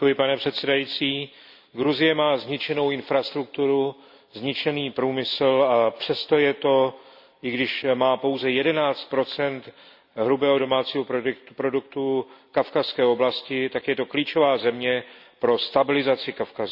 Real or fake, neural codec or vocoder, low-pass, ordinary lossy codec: real; none; 5.4 kHz; none